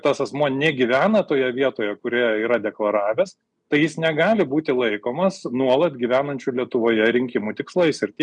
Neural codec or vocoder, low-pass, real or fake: none; 10.8 kHz; real